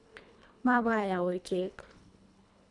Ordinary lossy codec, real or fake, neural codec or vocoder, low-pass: none; fake; codec, 24 kHz, 1.5 kbps, HILCodec; 10.8 kHz